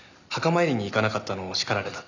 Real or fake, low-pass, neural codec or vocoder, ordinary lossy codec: real; 7.2 kHz; none; none